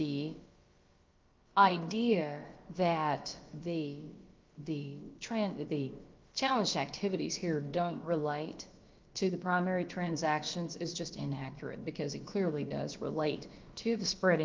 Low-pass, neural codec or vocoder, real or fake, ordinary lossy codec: 7.2 kHz; codec, 16 kHz, about 1 kbps, DyCAST, with the encoder's durations; fake; Opus, 32 kbps